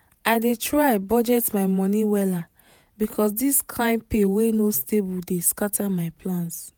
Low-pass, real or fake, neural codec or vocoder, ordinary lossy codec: none; fake; vocoder, 48 kHz, 128 mel bands, Vocos; none